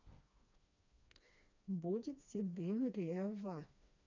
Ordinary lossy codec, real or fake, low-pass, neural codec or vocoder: none; fake; 7.2 kHz; codec, 16 kHz, 2 kbps, FreqCodec, smaller model